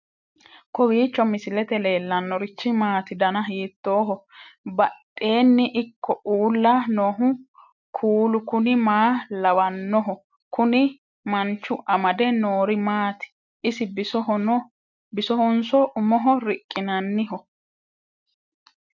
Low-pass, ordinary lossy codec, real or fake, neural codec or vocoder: 7.2 kHz; MP3, 64 kbps; real; none